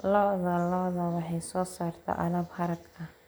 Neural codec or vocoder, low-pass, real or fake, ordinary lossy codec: none; none; real; none